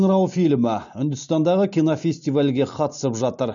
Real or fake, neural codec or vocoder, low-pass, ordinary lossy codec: real; none; 7.2 kHz; none